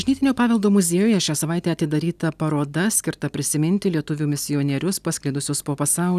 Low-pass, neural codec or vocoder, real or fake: 14.4 kHz; none; real